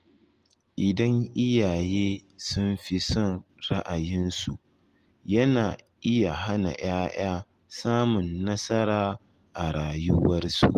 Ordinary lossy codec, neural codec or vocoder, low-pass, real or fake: Opus, 24 kbps; none; 14.4 kHz; real